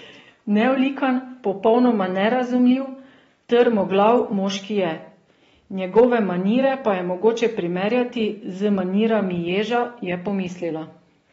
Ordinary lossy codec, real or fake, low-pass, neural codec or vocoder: AAC, 24 kbps; real; 10.8 kHz; none